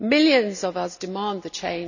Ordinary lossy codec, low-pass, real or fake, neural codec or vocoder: none; 7.2 kHz; real; none